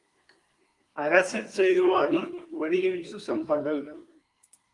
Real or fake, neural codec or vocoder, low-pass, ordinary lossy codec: fake; codec, 24 kHz, 1 kbps, SNAC; 10.8 kHz; Opus, 32 kbps